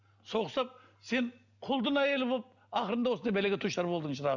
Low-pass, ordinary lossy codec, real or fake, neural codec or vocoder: 7.2 kHz; none; real; none